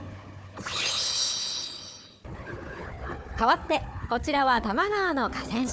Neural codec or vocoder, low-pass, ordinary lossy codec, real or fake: codec, 16 kHz, 4 kbps, FunCodec, trained on Chinese and English, 50 frames a second; none; none; fake